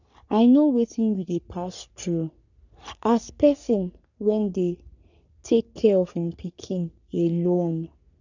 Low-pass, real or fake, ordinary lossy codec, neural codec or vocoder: 7.2 kHz; fake; none; codec, 44.1 kHz, 3.4 kbps, Pupu-Codec